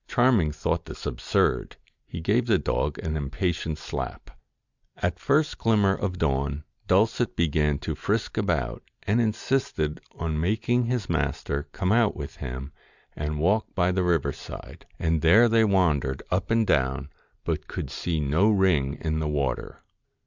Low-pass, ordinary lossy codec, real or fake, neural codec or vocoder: 7.2 kHz; Opus, 64 kbps; real; none